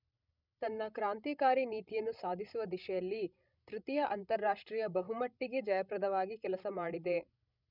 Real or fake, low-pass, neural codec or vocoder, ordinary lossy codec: fake; 5.4 kHz; codec, 16 kHz, 16 kbps, FreqCodec, larger model; none